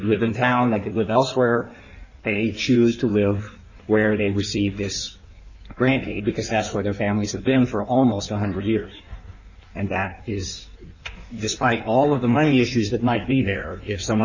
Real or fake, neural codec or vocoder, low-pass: fake; codec, 16 kHz in and 24 kHz out, 1.1 kbps, FireRedTTS-2 codec; 7.2 kHz